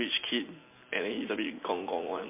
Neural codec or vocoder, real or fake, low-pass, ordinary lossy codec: none; real; 3.6 kHz; MP3, 24 kbps